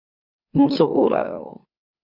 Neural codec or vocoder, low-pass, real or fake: autoencoder, 44.1 kHz, a latent of 192 numbers a frame, MeloTTS; 5.4 kHz; fake